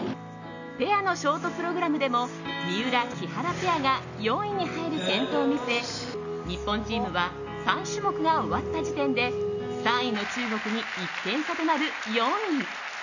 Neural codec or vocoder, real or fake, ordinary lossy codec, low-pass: none; real; none; 7.2 kHz